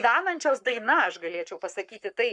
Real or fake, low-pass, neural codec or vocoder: fake; 9.9 kHz; codec, 44.1 kHz, 7.8 kbps, Pupu-Codec